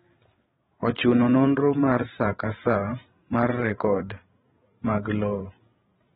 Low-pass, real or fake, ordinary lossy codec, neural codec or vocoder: 7.2 kHz; fake; AAC, 16 kbps; codec, 16 kHz, 16 kbps, FreqCodec, larger model